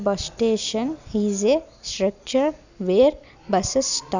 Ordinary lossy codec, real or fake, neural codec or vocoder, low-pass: none; real; none; 7.2 kHz